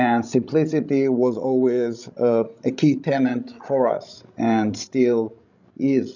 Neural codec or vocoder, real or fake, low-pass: codec, 16 kHz, 16 kbps, FreqCodec, larger model; fake; 7.2 kHz